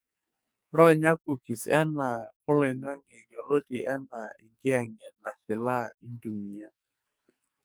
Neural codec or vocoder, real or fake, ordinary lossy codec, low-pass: codec, 44.1 kHz, 2.6 kbps, SNAC; fake; none; none